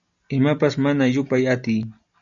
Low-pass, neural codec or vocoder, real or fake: 7.2 kHz; none; real